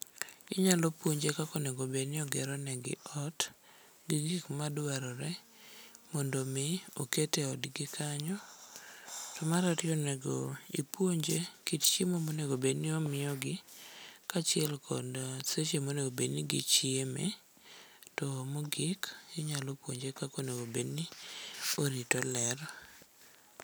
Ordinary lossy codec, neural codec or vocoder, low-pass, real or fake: none; none; none; real